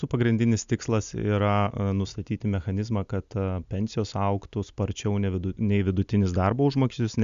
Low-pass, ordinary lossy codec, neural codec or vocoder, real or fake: 7.2 kHz; Opus, 64 kbps; none; real